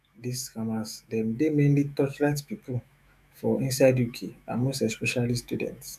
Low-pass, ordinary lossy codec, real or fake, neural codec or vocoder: 14.4 kHz; none; fake; autoencoder, 48 kHz, 128 numbers a frame, DAC-VAE, trained on Japanese speech